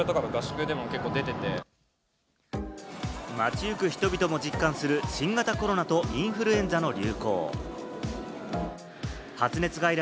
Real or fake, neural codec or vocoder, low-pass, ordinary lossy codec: real; none; none; none